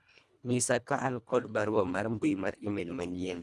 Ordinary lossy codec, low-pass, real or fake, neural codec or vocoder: none; none; fake; codec, 24 kHz, 1.5 kbps, HILCodec